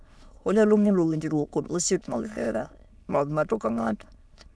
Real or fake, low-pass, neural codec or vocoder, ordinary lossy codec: fake; none; autoencoder, 22.05 kHz, a latent of 192 numbers a frame, VITS, trained on many speakers; none